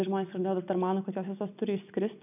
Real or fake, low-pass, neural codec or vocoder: real; 3.6 kHz; none